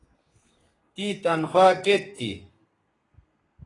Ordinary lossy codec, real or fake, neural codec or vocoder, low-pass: AAC, 32 kbps; fake; codec, 32 kHz, 1.9 kbps, SNAC; 10.8 kHz